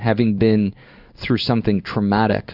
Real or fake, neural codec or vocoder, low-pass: fake; codec, 16 kHz in and 24 kHz out, 1 kbps, XY-Tokenizer; 5.4 kHz